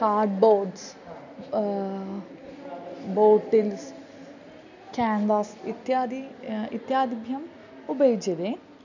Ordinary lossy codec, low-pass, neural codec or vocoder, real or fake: none; 7.2 kHz; none; real